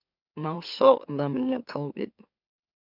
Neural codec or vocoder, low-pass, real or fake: autoencoder, 44.1 kHz, a latent of 192 numbers a frame, MeloTTS; 5.4 kHz; fake